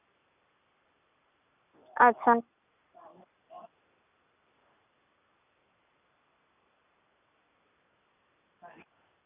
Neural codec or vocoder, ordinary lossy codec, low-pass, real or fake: none; none; 3.6 kHz; real